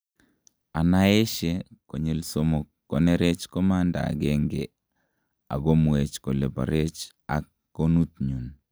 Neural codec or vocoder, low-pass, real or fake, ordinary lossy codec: none; none; real; none